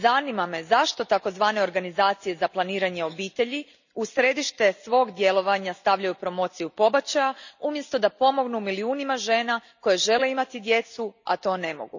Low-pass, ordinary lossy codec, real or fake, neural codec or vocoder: 7.2 kHz; none; real; none